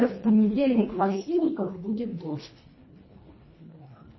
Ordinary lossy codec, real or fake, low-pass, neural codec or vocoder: MP3, 24 kbps; fake; 7.2 kHz; codec, 24 kHz, 1.5 kbps, HILCodec